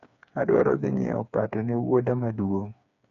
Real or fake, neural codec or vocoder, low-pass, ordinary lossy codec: fake; codec, 16 kHz, 4 kbps, FreqCodec, smaller model; 7.2 kHz; none